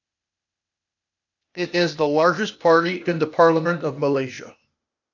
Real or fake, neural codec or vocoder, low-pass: fake; codec, 16 kHz, 0.8 kbps, ZipCodec; 7.2 kHz